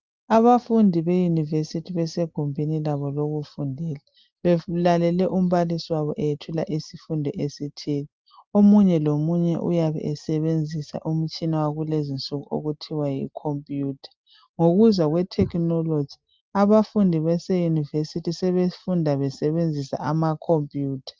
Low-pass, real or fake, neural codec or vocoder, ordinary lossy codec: 7.2 kHz; real; none; Opus, 32 kbps